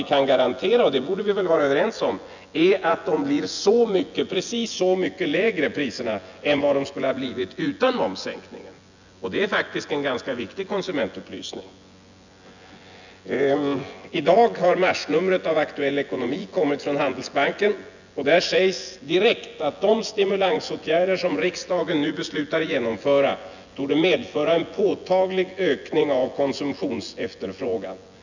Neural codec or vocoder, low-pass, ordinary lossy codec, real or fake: vocoder, 24 kHz, 100 mel bands, Vocos; 7.2 kHz; none; fake